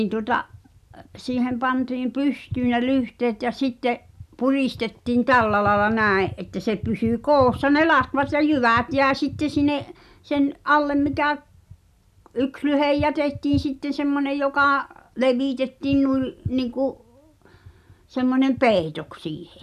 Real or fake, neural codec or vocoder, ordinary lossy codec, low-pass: real; none; none; 14.4 kHz